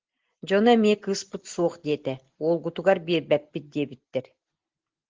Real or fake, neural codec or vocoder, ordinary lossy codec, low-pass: real; none; Opus, 16 kbps; 7.2 kHz